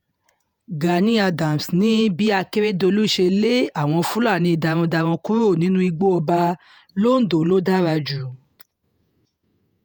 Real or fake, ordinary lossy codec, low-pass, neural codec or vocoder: fake; none; 19.8 kHz; vocoder, 48 kHz, 128 mel bands, Vocos